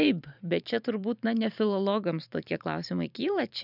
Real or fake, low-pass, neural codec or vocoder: fake; 5.4 kHz; vocoder, 44.1 kHz, 128 mel bands every 512 samples, BigVGAN v2